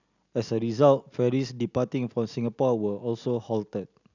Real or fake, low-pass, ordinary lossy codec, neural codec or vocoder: real; 7.2 kHz; none; none